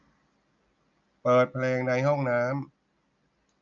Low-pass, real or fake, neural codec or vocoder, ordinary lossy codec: 7.2 kHz; real; none; none